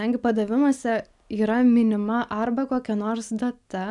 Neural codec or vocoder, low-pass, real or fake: none; 10.8 kHz; real